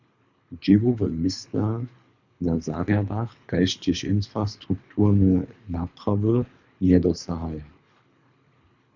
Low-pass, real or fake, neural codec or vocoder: 7.2 kHz; fake; codec, 24 kHz, 3 kbps, HILCodec